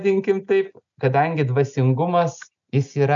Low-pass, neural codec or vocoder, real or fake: 7.2 kHz; none; real